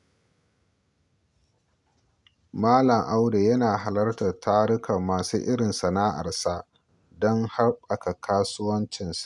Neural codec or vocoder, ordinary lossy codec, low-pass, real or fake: none; none; 10.8 kHz; real